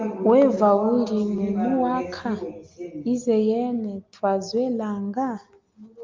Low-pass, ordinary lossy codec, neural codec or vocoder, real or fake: 7.2 kHz; Opus, 24 kbps; none; real